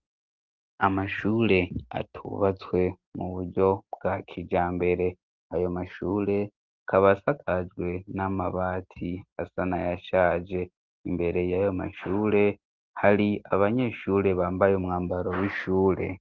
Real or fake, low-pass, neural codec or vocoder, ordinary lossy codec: real; 7.2 kHz; none; Opus, 16 kbps